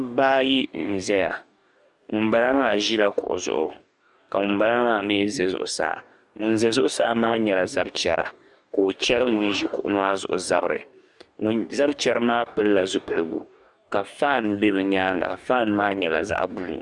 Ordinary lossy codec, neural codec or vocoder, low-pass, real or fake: Opus, 64 kbps; codec, 44.1 kHz, 2.6 kbps, DAC; 10.8 kHz; fake